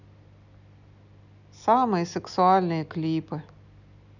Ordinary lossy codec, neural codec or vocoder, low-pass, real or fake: none; none; 7.2 kHz; real